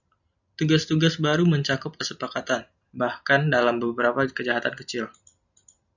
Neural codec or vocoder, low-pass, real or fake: none; 7.2 kHz; real